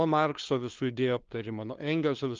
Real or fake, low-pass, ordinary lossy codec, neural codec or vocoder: fake; 7.2 kHz; Opus, 32 kbps; codec, 16 kHz, 2 kbps, FunCodec, trained on LibriTTS, 25 frames a second